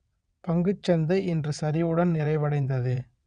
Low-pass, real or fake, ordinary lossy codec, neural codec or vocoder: 9.9 kHz; fake; none; vocoder, 22.05 kHz, 80 mel bands, WaveNeXt